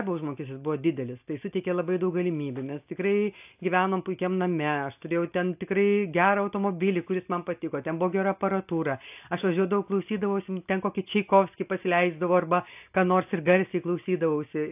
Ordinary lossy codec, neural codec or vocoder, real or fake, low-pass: AAC, 32 kbps; none; real; 3.6 kHz